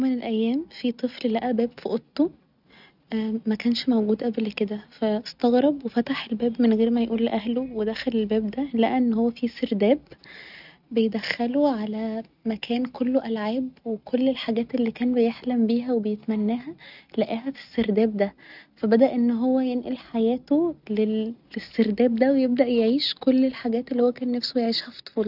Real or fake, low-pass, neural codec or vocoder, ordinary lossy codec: real; 5.4 kHz; none; none